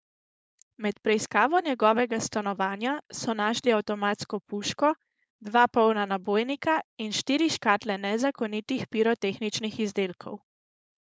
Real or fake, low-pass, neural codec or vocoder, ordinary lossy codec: fake; none; codec, 16 kHz, 4.8 kbps, FACodec; none